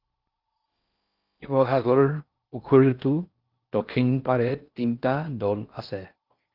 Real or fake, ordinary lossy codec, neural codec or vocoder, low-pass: fake; Opus, 24 kbps; codec, 16 kHz in and 24 kHz out, 0.6 kbps, FocalCodec, streaming, 4096 codes; 5.4 kHz